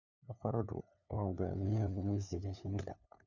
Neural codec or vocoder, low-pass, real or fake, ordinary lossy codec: codec, 16 kHz, 2 kbps, FunCodec, trained on LibriTTS, 25 frames a second; 7.2 kHz; fake; none